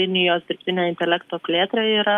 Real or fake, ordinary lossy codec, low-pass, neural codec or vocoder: real; Opus, 32 kbps; 14.4 kHz; none